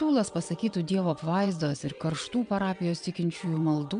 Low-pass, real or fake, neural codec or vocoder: 9.9 kHz; fake; vocoder, 22.05 kHz, 80 mel bands, WaveNeXt